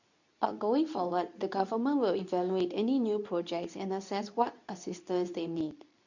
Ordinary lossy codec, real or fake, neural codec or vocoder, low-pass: none; fake; codec, 24 kHz, 0.9 kbps, WavTokenizer, medium speech release version 2; 7.2 kHz